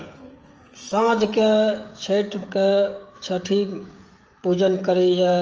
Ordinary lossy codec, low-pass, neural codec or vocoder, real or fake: Opus, 24 kbps; 7.2 kHz; codec, 16 kHz, 16 kbps, FreqCodec, smaller model; fake